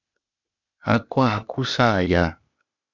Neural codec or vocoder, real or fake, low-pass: codec, 16 kHz, 0.8 kbps, ZipCodec; fake; 7.2 kHz